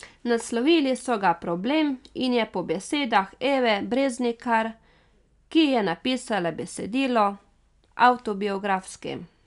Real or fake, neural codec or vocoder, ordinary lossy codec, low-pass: real; none; none; 10.8 kHz